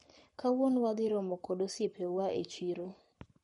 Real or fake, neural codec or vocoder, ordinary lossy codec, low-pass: fake; codec, 44.1 kHz, 7.8 kbps, Pupu-Codec; MP3, 48 kbps; 19.8 kHz